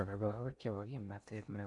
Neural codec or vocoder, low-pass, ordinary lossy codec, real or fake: codec, 16 kHz in and 24 kHz out, 0.6 kbps, FocalCodec, streaming, 4096 codes; 10.8 kHz; none; fake